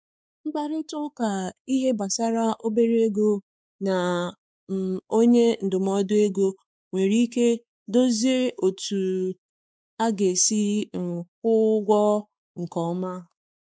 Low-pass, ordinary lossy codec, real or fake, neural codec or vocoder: none; none; fake; codec, 16 kHz, 4 kbps, X-Codec, WavLM features, trained on Multilingual LibriSpeech